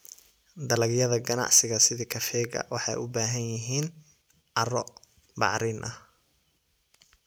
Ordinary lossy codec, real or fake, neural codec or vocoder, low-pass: none; real; none; none